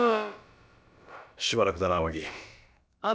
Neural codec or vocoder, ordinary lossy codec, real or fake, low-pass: codec, 16 kHz, about 1 kbps, DyCAST, with the encoder's durations; none; fake; none